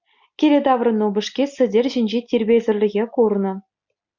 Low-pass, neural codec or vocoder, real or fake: 7.2 kHz; none; real